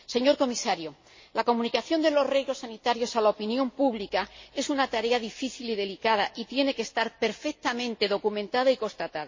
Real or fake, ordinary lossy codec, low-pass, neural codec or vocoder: real; MP3, 32 kbps; 7.2 kHz; none